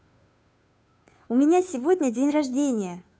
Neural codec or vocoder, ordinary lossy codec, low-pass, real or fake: codec, 16 kHz, 2 kbps, FunCodec, trained on Chinese and English, 25 frames a second; none; none; fake